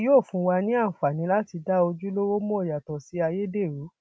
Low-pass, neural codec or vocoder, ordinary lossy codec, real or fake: none; none; none; real